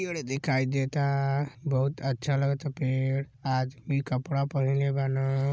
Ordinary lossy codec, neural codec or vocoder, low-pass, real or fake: none; none; none; real